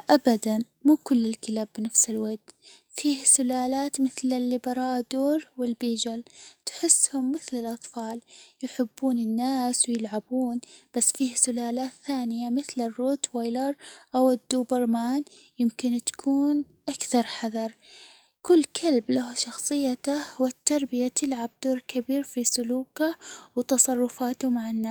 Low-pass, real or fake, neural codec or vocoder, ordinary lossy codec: none; fake; codec, 44.1 kHz, 7.8 kbps, DAC; none